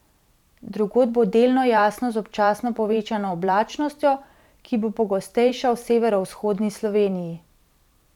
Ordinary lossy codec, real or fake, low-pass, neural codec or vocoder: none; fake; 19.8 kHz; vocoder, 44.1 kHz, 128 mel bands every 512 samples, BigVGAN v2